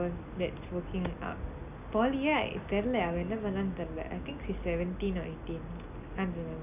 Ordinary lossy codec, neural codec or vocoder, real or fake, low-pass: none; none; real; 3.6 kHz